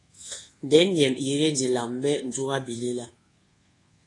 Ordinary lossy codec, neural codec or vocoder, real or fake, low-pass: AAC, 32 kbps; codec, 24 kHz, 1.2 kbps, DualCodec; fake; 10.8 kHz